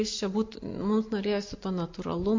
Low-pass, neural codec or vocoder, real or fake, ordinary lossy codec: 7.2 kHz; none; real; MP3, 48 kbps